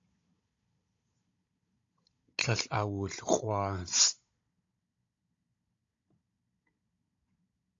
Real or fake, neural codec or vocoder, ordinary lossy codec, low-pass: fake; codec, 16 kHz, 16 kbps, FunCodec, trained on Chinese and English, 50 frames a second; AAC, 48 kbps; 7.2 kHz